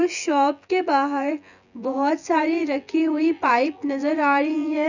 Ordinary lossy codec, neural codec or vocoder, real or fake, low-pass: none; vocoder, 24 kHz, 100 mel bands, Vocos; fake; 7.2 kHz